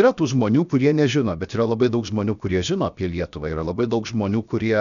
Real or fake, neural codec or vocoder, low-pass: fake; codec, 16 kHz, 0.7 kbps, FocalCodec; 7.2 kHz